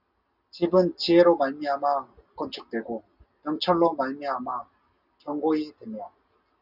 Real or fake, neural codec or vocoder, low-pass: real; none; 5.4 kHz